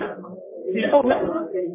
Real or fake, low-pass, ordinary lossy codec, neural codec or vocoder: fake; 3.6 kHz; MP3, 16 kbps; codec, 44.1 kHz, 1.7 kbps, Pupu-Codec